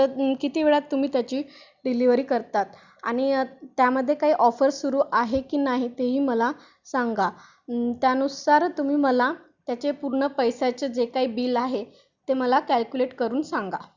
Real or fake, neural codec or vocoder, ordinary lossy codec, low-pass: real; none; Opus, 64 kbps; 7.2 kHz